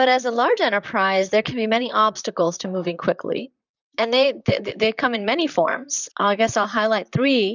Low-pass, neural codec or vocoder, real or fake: 7.2 kHz; vocoder, 44.1 kHz, 128 mel bands, Pupu-Vocoder; fake